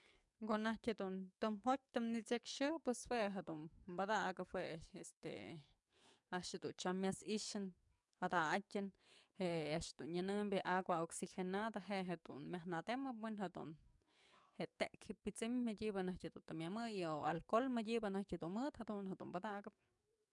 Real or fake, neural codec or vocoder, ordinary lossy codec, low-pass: fake; codec, 44.1 kHz, 7.8 kbps, DAC; MP3, 96 kbps; 10.8 kHz